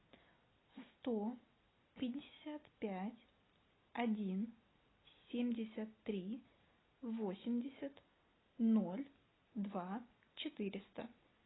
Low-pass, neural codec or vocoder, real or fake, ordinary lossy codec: 7.2 kHz; none; real; AAC, 16 kbps